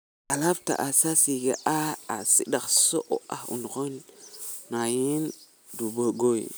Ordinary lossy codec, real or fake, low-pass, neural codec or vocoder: none; real; none; none